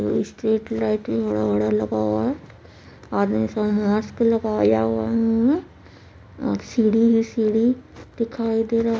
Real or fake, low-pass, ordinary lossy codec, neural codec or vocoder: real; none; none; none